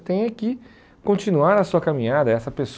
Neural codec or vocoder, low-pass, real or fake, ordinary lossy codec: none; none; real; none